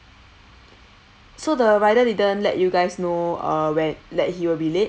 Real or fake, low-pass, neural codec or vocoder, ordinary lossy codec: real; none; none; none